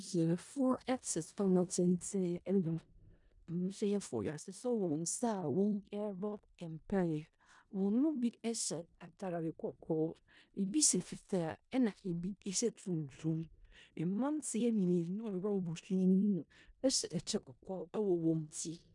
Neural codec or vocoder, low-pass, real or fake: codec, 16 kHz in and 24 kHz out, 0.4 kbps, LongCat-Audio-Codec, four codebook decoder; 10.8 kHz; fake